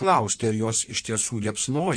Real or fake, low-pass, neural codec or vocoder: fake; 9.9 kHz; codec, 16 kHz in and 24 kHz out, 1.1 kbps, FireRedTTS-2 codec